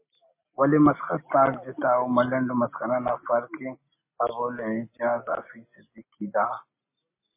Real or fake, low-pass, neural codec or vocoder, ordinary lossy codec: real; 3.6 kHz; none; MP3, 24 kbps